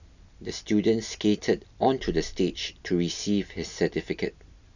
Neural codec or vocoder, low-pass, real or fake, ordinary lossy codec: none; 7.2 kHz; real; none